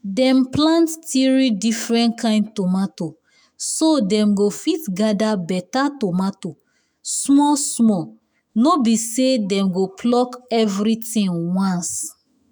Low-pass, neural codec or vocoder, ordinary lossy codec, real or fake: none; autoencoder, 48 kHz, 128 numbers a frame, DAC-VAE, trained on Japanese speech; none; fake